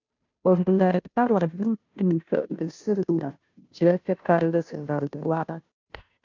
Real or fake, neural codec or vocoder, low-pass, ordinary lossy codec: fake; codec, 16 kHz, 0.5 kbps, FunCodec, trained on Chinese and English, 25 frames a second; 7.2 kHz; MP3, 64 kbps